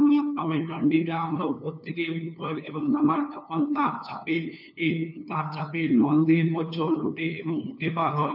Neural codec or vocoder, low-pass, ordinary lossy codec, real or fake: codec, 16 kHz, 2 kbps, FunCodec, trained on LibriTTS, 25 frames a second; 5.4 kHz; none; fake